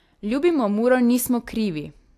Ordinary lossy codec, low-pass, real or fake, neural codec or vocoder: AAC, 64 kbps; 14.4 kHz; real; none